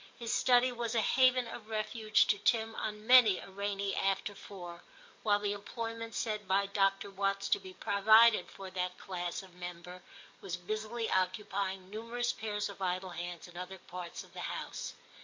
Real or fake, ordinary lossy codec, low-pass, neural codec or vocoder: fake; MP3, 48 kbps; 7.2 kHz; codec, 44.1 kHz, 7.8 kbps, DAC